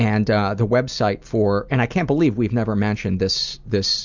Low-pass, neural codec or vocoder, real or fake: 7.2 kHz; none; real